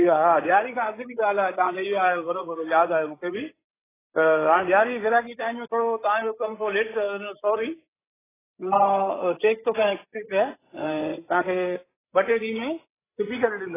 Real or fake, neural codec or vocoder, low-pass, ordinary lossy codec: fake; codec, 16 kHz, 16 kbps, FreqCodec, larger model; 3.6 kHz; AAC, 16 kbps